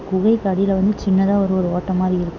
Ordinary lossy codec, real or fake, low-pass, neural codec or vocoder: none; real; 7.2 kHz; none